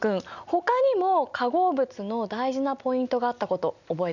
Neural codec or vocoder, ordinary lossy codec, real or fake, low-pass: none; none; real; 7.2 kHz